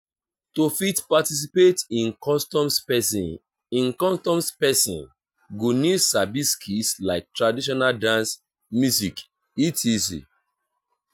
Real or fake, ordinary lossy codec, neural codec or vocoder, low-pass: real; none; none; none